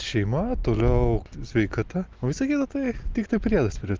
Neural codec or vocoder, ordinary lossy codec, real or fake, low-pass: none; Opus, 24 kbps; real; 7.2 kHz